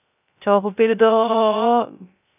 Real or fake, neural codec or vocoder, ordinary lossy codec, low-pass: fake; codec, 16 kHz, 0.3 kbps, FocalCodec; none; 3.6 kHz